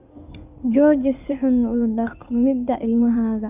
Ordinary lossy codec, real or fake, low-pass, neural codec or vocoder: none; fake; 3.6 kHz; codec, 16 kHz in and 24 kHz out, 2.2 kbps, FireRedTTS-2 codec